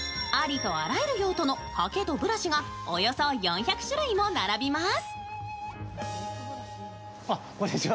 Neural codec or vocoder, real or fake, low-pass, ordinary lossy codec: none; real; none; none